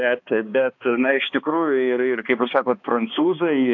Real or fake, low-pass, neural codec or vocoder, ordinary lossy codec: fake; 7.2 kHz; codec, 16 kHz, 2 kbps, X-Codec, HuBERT features, trained on balanced general audio; AAC, 48 kbps